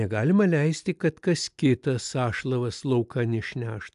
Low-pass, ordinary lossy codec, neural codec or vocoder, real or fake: 10.8 kHz; AAC, 96 kbps; none; real